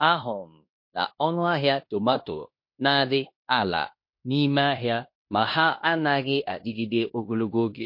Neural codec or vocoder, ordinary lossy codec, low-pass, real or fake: codec, 16 kHz in and 24 kHz out, 0.9 kbps, LongCat-Audio-Codec, fine tuned four codebook decoder; MP3, 32 kbps; 5.4 kHz; fake